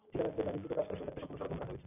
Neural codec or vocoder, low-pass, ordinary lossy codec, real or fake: none; 3.6 kHz; AAC, 16 kbps; real